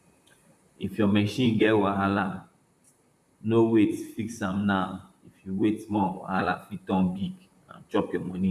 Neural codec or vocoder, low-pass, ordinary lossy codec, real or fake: vocoder, 44.1 kHz, 128 mel bands, Pupu-Vocoder; 14.4 kHz; none; fake